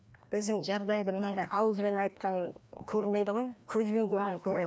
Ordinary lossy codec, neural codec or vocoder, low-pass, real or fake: none; codec, 16 kHz, 1 kbps, FreqCodec, larger model; none; fake